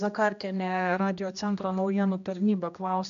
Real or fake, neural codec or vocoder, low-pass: fake; codec, 16 kHz, 1 kbps, X-Codec, HuBERT features, trained on general audio; 7.2 kHz